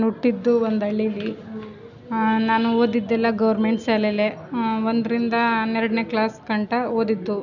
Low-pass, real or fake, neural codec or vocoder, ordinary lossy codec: 7.2 kHz; real; none; none